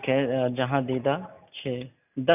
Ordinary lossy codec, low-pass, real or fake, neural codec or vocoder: none; 3.6 kHz; real; none